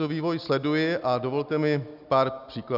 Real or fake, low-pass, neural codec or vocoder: real; 5.4 kHz; none